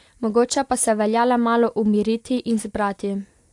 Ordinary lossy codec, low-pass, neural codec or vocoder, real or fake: AAC, 64 kbps; 10.8 kHz; none; real